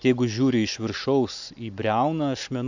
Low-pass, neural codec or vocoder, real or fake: 7.2 kHz; none; real